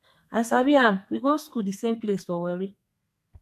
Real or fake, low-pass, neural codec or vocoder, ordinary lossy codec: fake; 14.4 kHz; codec, 32 kHz, 1.9 kbps, SNAC; AAC, 96 kbps